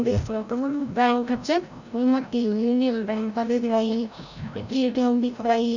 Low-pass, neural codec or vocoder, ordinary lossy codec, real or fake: 7.2 kHz; codec, 16 kHz, 0.5 kbps, FreqCodec, larger model; none; fake